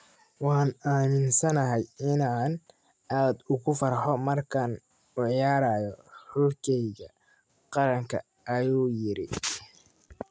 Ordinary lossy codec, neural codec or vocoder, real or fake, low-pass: none; none; real; none